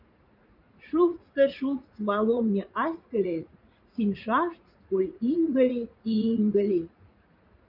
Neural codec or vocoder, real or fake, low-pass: vocoder, 44.1 kHz, 80 mel bands, Vocos; fake; 5.4 kHz